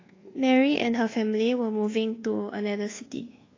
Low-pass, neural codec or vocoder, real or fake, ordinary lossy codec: 7.2 kHz; codec, 24 kHz, 1.2 kbps, DualCodec; fake; AAC, 32 kbps